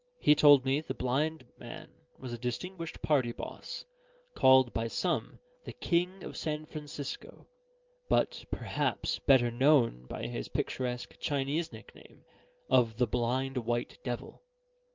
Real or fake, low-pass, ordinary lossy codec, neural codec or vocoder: real; 7.2 kHz; Opus, 24 kbps; none